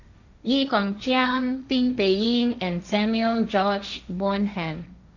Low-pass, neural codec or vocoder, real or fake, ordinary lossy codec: 7.2 kHz; codec, 16 kHz, 1.1 kbps, Voila-Tokenizer; fake; none